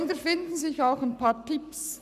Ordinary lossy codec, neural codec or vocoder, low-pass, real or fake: none; codec, 44.1 kHz, 7.8 kbps, Pupu-Codec; 14.4 kHz; fake